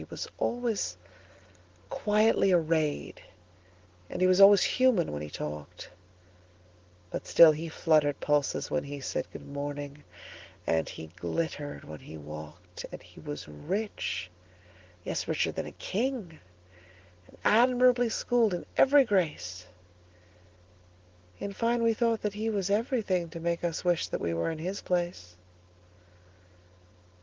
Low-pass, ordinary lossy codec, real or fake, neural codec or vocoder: 7.2 kHz; Opus, 16 kbps; real; none